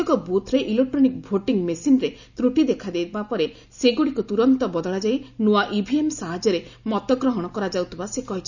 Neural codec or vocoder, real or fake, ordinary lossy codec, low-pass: none; real; none; 7.2 kHz